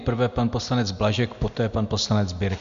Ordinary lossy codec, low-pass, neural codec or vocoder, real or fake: MP3, 64 kbps; 7.2 kHz; none; real